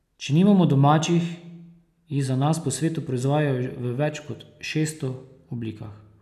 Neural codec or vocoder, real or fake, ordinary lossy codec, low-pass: none; real; none; 14.4 kHz